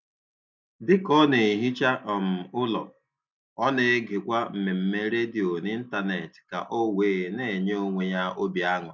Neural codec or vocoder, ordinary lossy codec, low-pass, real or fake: none; MP3, 64 kbps; 7.2 kHz; real